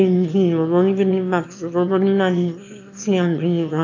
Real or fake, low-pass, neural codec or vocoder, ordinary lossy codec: fake; 7.2 kHz; autoencoder, 22.05 kHz, a latent of 192 numbers a frame, VITS, trained on one speaker; AAC, 48 kbps